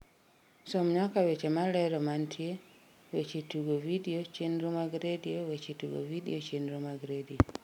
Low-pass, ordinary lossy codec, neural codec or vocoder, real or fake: 19.8 kHz; none; none; real